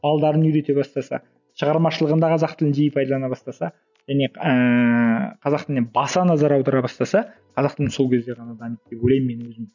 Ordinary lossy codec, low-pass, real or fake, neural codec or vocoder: none; 7.2 kHz; real; none